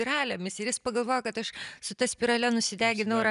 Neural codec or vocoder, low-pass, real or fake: none; 10.8 kHz; real